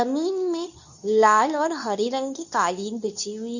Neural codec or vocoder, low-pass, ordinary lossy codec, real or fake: codec, 24 kHz, 0.9 kbps, WavTokenizer, medium speech release version 2; 7.2 kHz; none; fake